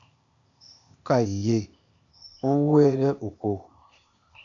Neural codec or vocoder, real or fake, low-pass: codec, 16 kHz, 0.8 kbps, ZipCodec; fake; 7.2 kHz